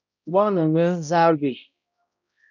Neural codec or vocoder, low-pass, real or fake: codec, 16 kHz, 0.5 kbps, X-Codec, HuBERT features, trained on balanced general audio; 7.2 kHz; fake